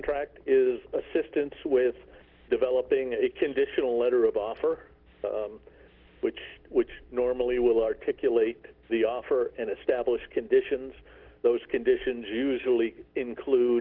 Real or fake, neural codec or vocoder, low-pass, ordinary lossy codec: real; none; 5.4 kHz; Opus, 16 kbps